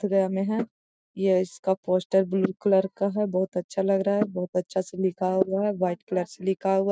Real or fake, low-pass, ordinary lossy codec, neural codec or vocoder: real; none; none; none